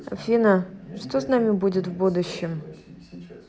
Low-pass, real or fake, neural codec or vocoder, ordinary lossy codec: none; real; none; none